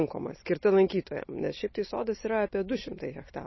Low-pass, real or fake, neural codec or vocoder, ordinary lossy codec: 7.2 kHz; real; none; MP3, 24 kbps